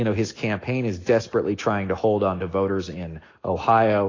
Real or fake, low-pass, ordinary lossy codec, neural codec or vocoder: real; 7.2 kHz; AAC, 32 kbps; none